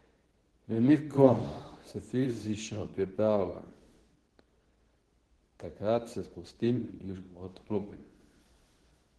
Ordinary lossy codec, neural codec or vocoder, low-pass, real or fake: Opus, 16 kbps; codec, 24 kHz, 0.9 kbps, WavTokenizer, medium speech release version 2; 10.8 kHz; fake